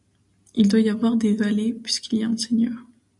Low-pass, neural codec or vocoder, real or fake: 10.8 kHz; none; real